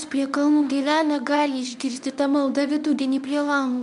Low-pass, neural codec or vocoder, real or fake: 10.8 kHz; codec, 24 kHz, 0.9 kbps, WavTokenizer, medium speech release version 1; fake